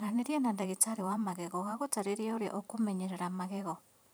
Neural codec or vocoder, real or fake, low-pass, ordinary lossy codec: vocoder, 44.1 kHz, 128 mel bands every 512 samples, BigVGAN v2; fake; none; none